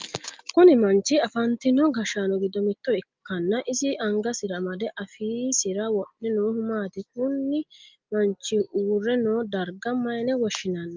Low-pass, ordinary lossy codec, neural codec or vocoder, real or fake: 7.2 kHz; Opus, 32 kbps; none; real